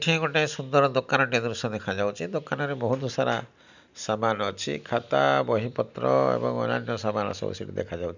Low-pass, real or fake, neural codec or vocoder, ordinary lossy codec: 7.2 kHz; real; none; none